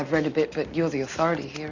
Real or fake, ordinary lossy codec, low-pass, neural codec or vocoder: real; Opus, 64 kbps; 7.2 kHz; none